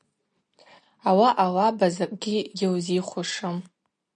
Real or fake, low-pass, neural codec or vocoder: real; 9.9 kHz; none